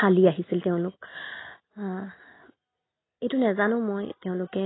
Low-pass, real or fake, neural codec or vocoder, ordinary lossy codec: 7.2 kHz; real; none; AAC, 16 kbps